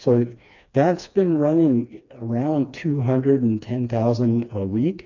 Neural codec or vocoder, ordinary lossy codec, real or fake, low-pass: codec, 16 kHz, 2 kbps, FreqCodec, smaller model; AAC, 48 kbps; fake; 7.2 kHz